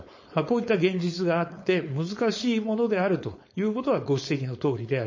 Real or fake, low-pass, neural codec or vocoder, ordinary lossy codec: fake; 7.2 kHz; codec, 16 kHz, 4.8 kbps, FACodec; MP3, 32 kbps